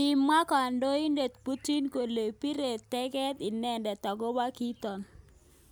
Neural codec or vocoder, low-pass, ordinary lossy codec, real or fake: none; none; none; real